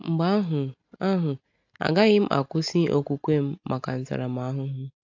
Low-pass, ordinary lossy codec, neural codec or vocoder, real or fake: 7.2 kHz; AAC, 48 kbps; none; real